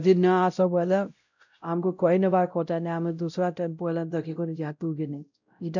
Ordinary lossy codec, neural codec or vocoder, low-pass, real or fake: none; codec, 16 kHz, 0.5 kbps, X-Codec, WavLM features, trained on Multilingual LibriSpeech; 7.2 kHz; fake